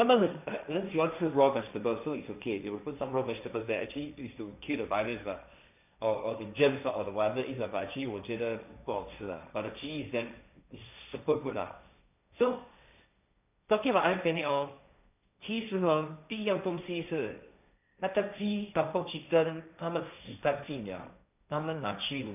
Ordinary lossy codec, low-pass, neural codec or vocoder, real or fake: none; 3.6 kHz; codec, 16 kHz, 1.1 kbps, Voila-Tokenizer; fake